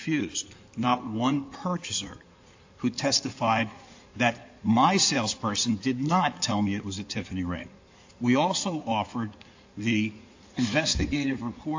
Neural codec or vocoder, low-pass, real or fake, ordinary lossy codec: codec, 16 kHz in and 24 kHz out, 2.2 kbps, FireRedTTS-2 codec; 7.2 kHz; fake; AAC, 48 kbps